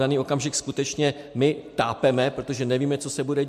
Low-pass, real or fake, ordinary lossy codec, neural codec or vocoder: 14.4 kHz; real; MP3, 64 kbps; none